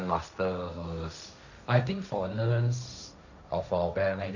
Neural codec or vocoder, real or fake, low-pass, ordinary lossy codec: codec, 16 kHz, 1.1 kbps, Voila-Tokenizer; fake; 7.2 kHz; none